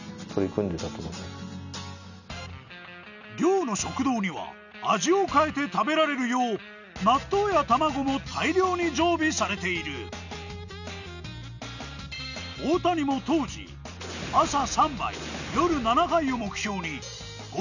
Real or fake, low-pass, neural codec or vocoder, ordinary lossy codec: real; 7.2 kHz; none; none